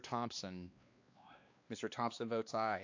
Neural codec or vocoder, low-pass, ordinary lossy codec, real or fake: codec, 16 kHz, 2 kbps, X-Codec, WavLM features, trained on Multilingual LibriSpeech; 7.2 kHz; AAC, 48 kbps; fake